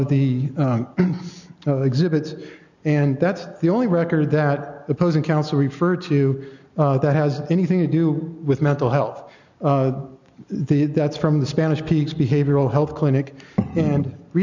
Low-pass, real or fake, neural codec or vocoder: 7.2 kHz; real; none